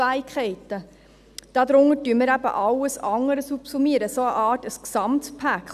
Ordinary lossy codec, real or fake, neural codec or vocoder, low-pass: none; real; none; 14.4 kHz